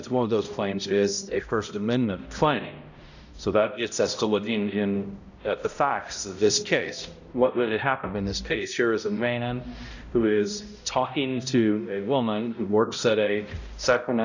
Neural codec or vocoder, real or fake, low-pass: codec, 16 kHz, 0.5 kbps, X-Codec, HuBERT features, trained on balanced general audio; fake; 7.2 kHz